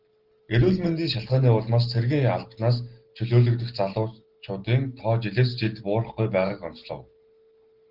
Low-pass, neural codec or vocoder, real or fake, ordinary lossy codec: 5.4 kHz; none; real; Opus, 16 kbps